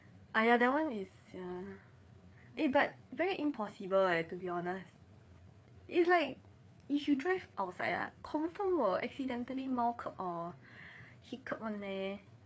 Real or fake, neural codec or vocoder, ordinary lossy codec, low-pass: fake; codec, 16 kHz, 4 kbps, FreqCodec, larger model; none; none